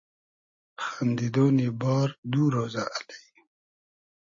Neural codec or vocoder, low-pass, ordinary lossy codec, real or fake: none; 7.2 kHz; MP3, 32 kbps; real